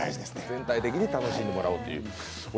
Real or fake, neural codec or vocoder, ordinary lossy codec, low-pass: real; none; none; none